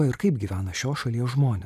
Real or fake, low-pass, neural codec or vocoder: real; 14.4 kHz; none